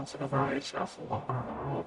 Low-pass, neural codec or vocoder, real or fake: 10.8 kHz; codec, 44.1 kHz, 0.9 kbps, DAC; fake